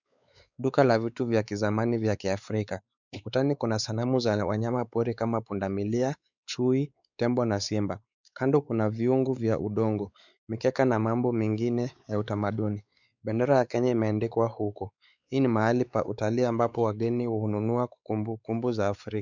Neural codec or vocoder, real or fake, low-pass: codec, 16 kHz, 4 kbps, X-Codec, WavLM features, trained on Multilingual LibriSpeech; fake; 7.2 kHz